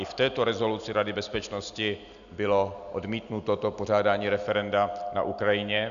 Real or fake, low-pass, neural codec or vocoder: real; 7.2 kHz; none